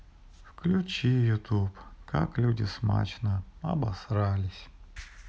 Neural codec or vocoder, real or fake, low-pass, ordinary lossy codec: none; real; none; none